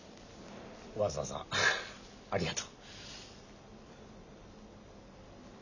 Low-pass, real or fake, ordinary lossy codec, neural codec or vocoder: 7.2 kHz; real; none; none